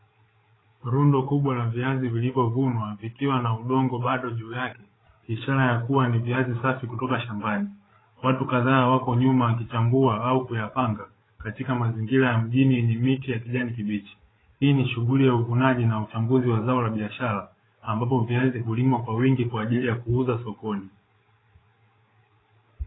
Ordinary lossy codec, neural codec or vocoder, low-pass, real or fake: AAC, 16 kbps; codec, 16 kHz, 8 kbps, FreqCodec, larger model; 7.2 kHz; fake